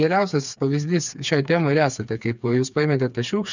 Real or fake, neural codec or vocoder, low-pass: fake; codec, 16 kHz, 4 kbps, FreqCodec, smaller model; 7.2 kHz